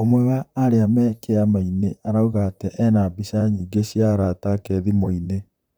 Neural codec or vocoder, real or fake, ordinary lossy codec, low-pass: vocoder, 44.1 kHz, 128 mel bands, Pupu-Vocoder; fake; none; none